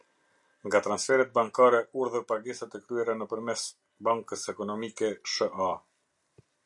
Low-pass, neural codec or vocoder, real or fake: 10.8 kHz; none; real